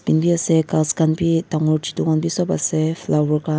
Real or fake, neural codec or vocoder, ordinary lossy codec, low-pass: real; none; none; none